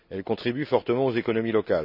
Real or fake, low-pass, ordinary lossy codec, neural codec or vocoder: real; 5.4 kHz; none; none